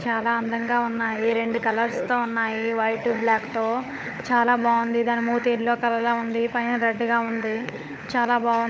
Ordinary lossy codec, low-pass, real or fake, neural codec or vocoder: none; none; fake; codec, 16 kHz, 16 kbps, FunCodec, trained on LibriTTS, 50 frames a second